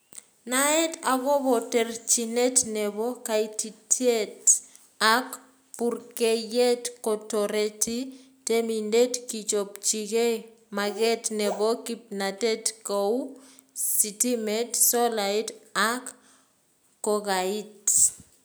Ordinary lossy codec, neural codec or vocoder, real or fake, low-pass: none; none; real; none